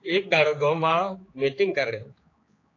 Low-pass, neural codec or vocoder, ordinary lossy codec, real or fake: 7.2 kHz; codec, 16 kHz, 4 kbps, X-Codec, HuBERT features, trained on general audio; AAC, 32 kbps; fake